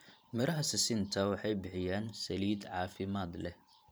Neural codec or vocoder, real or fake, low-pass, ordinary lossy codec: vocoder, 44.1 kHz, 128 mel bands every 512 samples, BigVGAN v2; fake; none; none